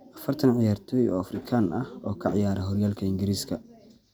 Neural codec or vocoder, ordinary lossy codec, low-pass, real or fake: none; none; none; real